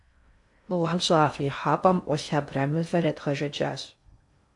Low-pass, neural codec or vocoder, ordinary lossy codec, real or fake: 10.8 kHz; codec, 16 kHz in and 24 kHz out, 0.6 kbps, FocalCodec, streaming, 2048 codes; AAC, 64 kbps; fake